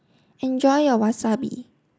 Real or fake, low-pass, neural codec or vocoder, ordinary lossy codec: real; none; none; none